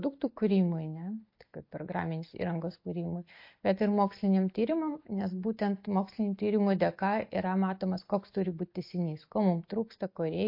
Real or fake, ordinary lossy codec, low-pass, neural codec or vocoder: real; MP3, 32 kbps; 5.4 kHz; none